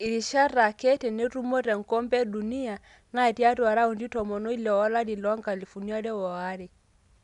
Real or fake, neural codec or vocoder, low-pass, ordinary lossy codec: real; none; 10.8 kHz; none